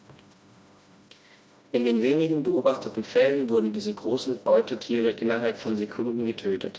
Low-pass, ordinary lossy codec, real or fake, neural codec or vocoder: none; none; fake; codec, 16 kHz, 1 kbps, FreqCodec, smaller model